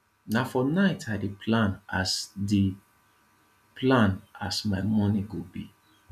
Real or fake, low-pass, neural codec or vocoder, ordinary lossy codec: real; 14.4 kHz; none; none